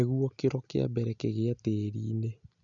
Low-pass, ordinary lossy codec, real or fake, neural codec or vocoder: 7.2 kHz; none; real; none